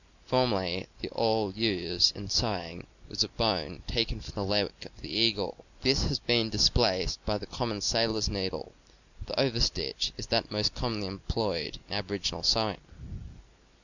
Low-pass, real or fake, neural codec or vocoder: 7.2 kHz; real; none